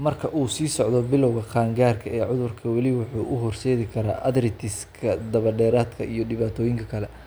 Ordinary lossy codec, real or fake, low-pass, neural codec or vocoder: none; real; none; none